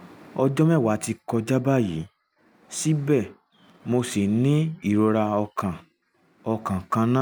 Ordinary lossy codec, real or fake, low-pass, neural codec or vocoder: none; real; none; none